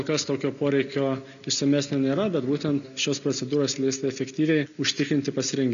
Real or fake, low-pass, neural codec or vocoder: real; 7.2 kHz; none